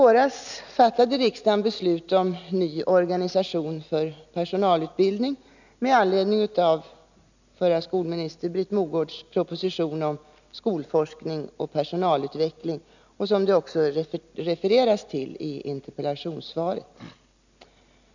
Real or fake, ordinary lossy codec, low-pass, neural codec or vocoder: real; none; 7.2 kHz; none